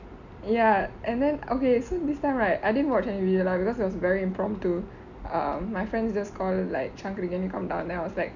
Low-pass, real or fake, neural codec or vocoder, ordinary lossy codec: 7.2 kHz; real; none; none